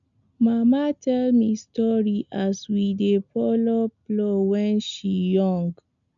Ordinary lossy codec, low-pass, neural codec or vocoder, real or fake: MP3, 96 kbps; 7.2 kHz; none; real